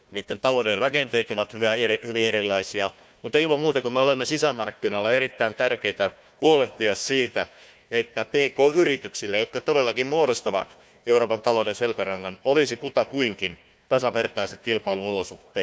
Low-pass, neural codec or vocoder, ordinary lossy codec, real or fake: none; codec, 16 kHz, 1 kbps, FunCodec, trained on Chinese and English, 50 frames a second; none; fake